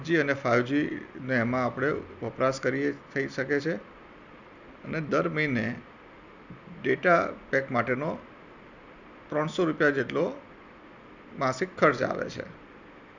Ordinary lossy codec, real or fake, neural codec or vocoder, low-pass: none; real; none; 7.2 kHz